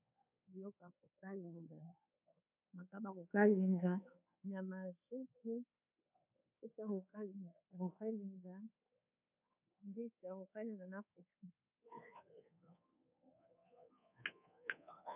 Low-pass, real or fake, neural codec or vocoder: 3.6 kHz; fake; codec, 24 kHz, 1.2 kbps, DualCodec